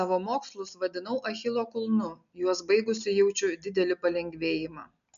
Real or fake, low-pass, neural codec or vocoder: real; 7.2 kHz; none